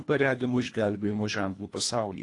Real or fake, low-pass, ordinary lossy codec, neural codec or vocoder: fake; 10.8 kHz; AAC, 48 kbps; codec, 24 kHz, 1.5 kbps, HILCodec